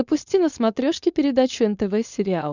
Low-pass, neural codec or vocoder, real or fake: 7.2 kHz; codec, 16 kHz, 4.8 kbps, FACodec; fake